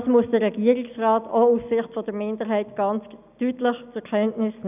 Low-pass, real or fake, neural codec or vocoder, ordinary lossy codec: 3.6 kHz; real; none; none